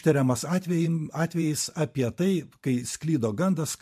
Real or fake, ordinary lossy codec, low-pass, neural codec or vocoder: fake; MP3, 64 kbps; 14.4 kHz; vocoder, 44.1 kHz, 128 mel bands every 512 samples, BigVGAN v2